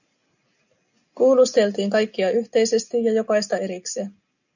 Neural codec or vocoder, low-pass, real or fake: none; 7.2 kHz; real